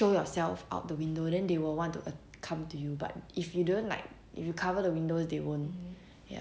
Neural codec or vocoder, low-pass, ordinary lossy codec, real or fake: none; none; none; real